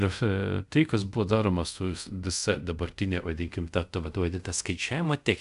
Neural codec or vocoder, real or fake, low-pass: codec, 24 kHz, 0.5 kbps, DualCodec; fake; 10.8 kHz